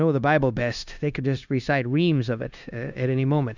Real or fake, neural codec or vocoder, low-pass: fake; codec, 16 kHz, 0.9 kbps, LongCat-Audio-Codec; 7.2 kHz